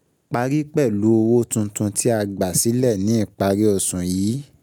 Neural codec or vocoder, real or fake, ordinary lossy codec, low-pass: none; real; none; none